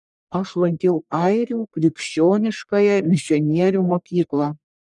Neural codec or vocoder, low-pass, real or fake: codec, 44.1 kHz, 1.7 kbps, Pupu-Codec; 10.8 kHz; fake